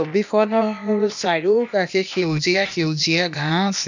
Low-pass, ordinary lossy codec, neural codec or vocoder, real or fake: 7.2 kHz; none; codec, 16 kHz, 0.8 kbps, ZipCodec; fake